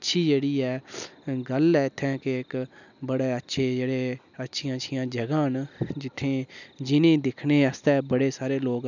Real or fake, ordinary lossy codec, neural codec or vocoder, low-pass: real; none; none; 7.2 kHz